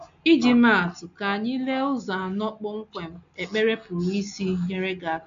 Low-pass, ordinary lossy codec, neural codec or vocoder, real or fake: 7.2 kHz; AAC, 64 kbps; none; real